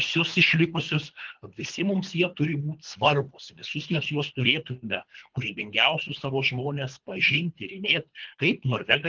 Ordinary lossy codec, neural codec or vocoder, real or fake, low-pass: Opus, 32 kbps; codec, 24 kHz, 3 kbps, HILCodec; fake; 7.2 kHz